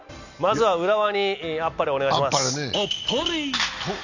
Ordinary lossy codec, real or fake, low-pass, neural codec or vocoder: none; real; 7.2 kHz; none